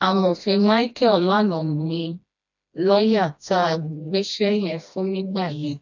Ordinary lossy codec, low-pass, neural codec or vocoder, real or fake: none; 7.2 kHz; codec, 16 kHz, 1 kbps, FreqCodec, smaller model; fake